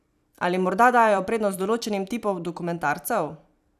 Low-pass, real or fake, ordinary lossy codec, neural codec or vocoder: 14.4 kHz; fake; none; vocoder, 44.1 kHz, 128 mel bands every 256 samples, BigVGAN v2